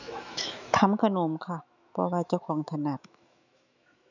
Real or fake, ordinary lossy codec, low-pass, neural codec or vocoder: fake; none; 7.2 kHz; autoencoder, 48 kHz, 128 numbers a frame, DAC-VAE, trained on Japanese speech